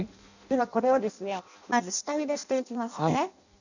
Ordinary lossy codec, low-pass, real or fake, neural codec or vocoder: none; 7.2 kHz; fake; codec, 16 kHz in and 24 kHz out, 0.6 kbps, FireRedTTS-2 codec